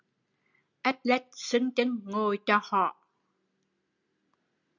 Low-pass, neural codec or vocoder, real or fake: 7.2 kHz; none; real